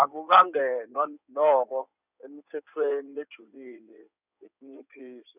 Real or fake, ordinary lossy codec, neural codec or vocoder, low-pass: fake; none; codec, 16 kHz in and 24 kHz out, 2.2 kbps, FireRedTTS-2 codec; 3.6 kHz